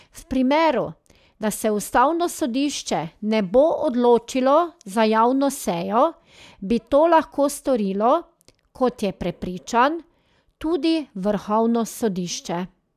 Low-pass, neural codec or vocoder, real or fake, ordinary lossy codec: 14.4 kHz; none; real; none